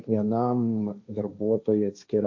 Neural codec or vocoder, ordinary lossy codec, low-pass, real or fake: codec, 16 kHz, 1.1 kbps, Voila-Tokenizer; AAC, 48 kbps; 7.2 kHz; fake